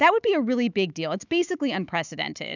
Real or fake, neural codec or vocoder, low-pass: fake; autoencoder, 48 kHz, 128 numbers a frame, DAC-VAE, trained on Japanese speech; 7.2 kHz